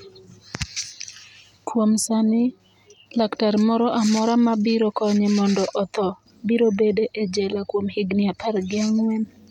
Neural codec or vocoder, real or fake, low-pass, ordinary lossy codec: none; real; 19.8 kHz; none